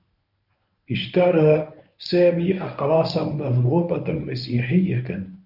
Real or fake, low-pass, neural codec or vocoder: fake; 5.4 kHz; codec, 24 kHz, 0.9 kbps, WavTokenizer, medium speech release version 1